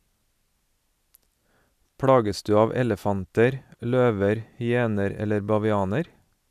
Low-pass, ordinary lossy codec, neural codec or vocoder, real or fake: 14.4 kHz; none; none; real